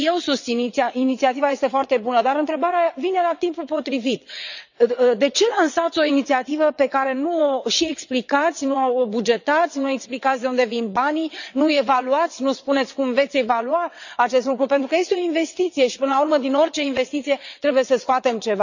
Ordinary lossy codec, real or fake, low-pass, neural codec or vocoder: none; fake; 7.2 kHz; vocoder, 22.05 kHz, 80 mel bands, WaveNeXt